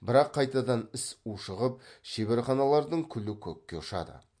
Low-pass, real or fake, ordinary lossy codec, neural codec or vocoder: 9.9 kHz; real; MP3, 48 kbps; none